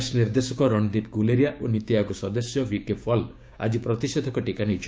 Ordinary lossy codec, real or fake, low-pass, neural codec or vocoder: none; fake; none; codec, 16 kHz, 6 kbps, DAC